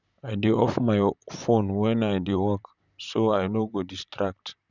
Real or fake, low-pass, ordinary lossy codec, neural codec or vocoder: fake; 7.2 kHz; none; vocoder, 44.1 kHz, 128 mel bands, Pupu-Vocoder